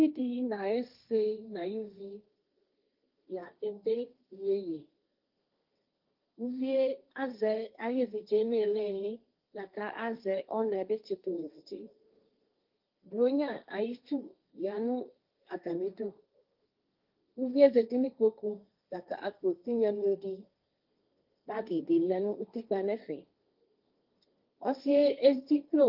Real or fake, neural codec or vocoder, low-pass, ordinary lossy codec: fake; codec, 16 kHz, 1.1 kbps, Voila-Tokenizer; 5.4 kHz; Opus, 24 kbps